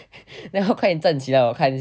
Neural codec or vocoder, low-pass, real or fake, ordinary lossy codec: none; none; real; none